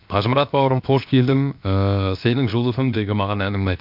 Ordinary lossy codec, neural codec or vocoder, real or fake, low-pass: MP3, 48 kbps; codec, 16 kHz, 0.7 kbps, FocalCodec; fake; 5.4 kHz